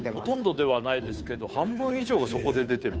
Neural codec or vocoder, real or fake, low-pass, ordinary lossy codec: codec, 16 kHz, 2 kbps, FunCodec, trained on Chinese and English, 25 frames a second; fake; none; none